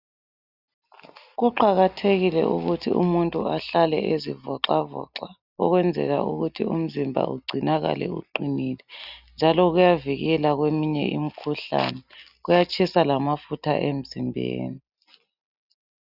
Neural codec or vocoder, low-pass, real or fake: none; 5.4 kHz; real